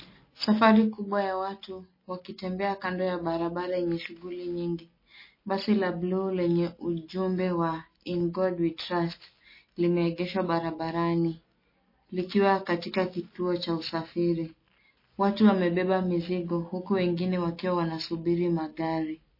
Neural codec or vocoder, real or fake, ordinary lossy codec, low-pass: none; real; MP3, 24 kbps; 5.4 kHz